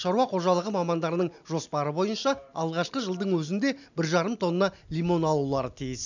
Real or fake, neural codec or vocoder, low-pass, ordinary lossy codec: real; none; 7.2 kHz; none